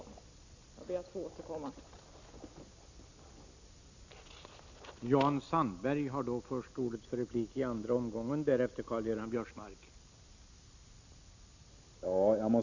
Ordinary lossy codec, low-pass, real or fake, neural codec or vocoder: Opus, 64 kbps; 7.2 kHz; real; none